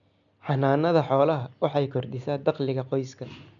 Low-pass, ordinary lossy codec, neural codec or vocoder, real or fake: 7.2 kHz; none; none; real